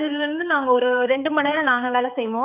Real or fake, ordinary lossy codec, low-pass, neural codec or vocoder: fake; none; 3.6 kHz; codec, 16 kHz, 4 kbps, X-Codec, HuBERT features, trained on balanced general audio